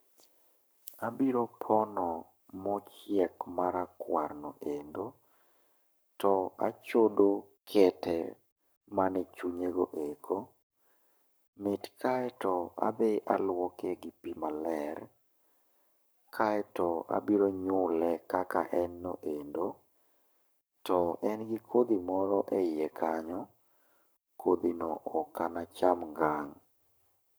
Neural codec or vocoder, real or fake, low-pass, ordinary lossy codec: codec, 44.1 kHz, 7.8 kbps, Pupu-Codec; fake; none; none